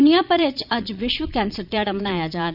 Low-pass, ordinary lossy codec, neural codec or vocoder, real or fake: 5.4 kHz; none; vocoder, 22.05 kHz, 80 mel bands, Vocos; fake